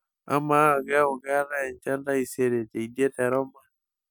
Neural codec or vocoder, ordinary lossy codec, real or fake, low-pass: none; none; real; none